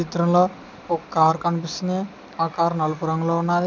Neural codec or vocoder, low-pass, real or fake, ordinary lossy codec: none; none; real; none